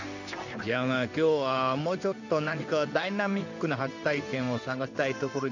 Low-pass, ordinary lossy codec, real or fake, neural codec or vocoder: 7.2 kHz; none; fake; codec, 16 kHz in and 24 kHz out, 1 kbps, XY-Tokenizer